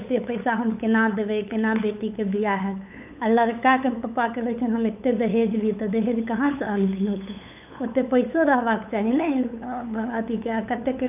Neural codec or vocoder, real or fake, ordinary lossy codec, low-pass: codec, 16 kHz, 8 kbps, FunCodec, trained on LibriTTS, 25 frames a second; fake; none; 3.6 kHz